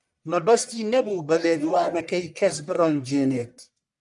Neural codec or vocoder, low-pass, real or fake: codec, 44.1 kHz, 1.7 kbps, Pupu-Codec; 10.8 kHz; fake